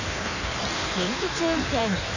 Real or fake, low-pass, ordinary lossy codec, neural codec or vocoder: fake; 7.2 kHz; none; codec, 16 kHz in and 24 kHz out, 1.1 kbps, FireRedTTS-2 codec